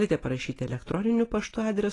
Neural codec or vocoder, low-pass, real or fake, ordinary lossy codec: none; 10.8 kHz; real; AAC, 32 kbps